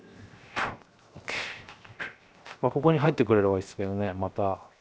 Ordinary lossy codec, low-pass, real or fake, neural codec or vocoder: none; none; fake; codec, 16 kHz, 0.7 kbps, FocalCodec